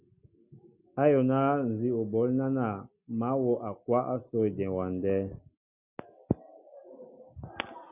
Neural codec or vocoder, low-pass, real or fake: none; 3.6 kHz; real